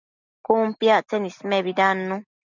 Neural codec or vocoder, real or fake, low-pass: none; real; 7.2 kHz